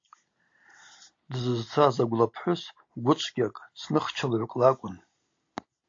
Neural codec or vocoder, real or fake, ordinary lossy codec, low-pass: none; real; AAC, 48 kbps; 7.2 kHz